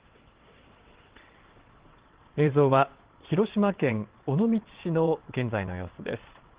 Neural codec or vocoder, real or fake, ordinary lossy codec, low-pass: vocoder, 22.05 kHz, 80 mel bands, WaveNeXt; fake; Opus, 16 kbps; 3.6 kHz